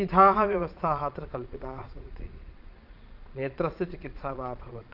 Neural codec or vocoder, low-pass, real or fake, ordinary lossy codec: vocoder, 22.05 kHz, 80 mel bands, Vocos; 5.4 kHz; fake; Opus, 24 kbps